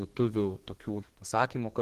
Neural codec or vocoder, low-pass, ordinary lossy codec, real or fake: codec, 32 kHz, 1.9 kbps, SNAC; 14.4 kHz; Opus, 16 kbps; fake